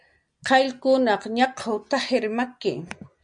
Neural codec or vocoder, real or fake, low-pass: none; real; 9.9 kHz